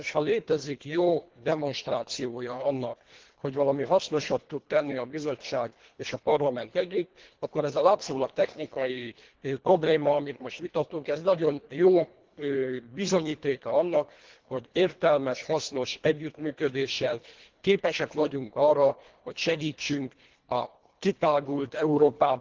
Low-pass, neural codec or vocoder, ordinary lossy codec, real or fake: 7.2 kHz; codec, 24 kHz, 1.5 kbps, HILCodec; Opus, 16 kbps; fake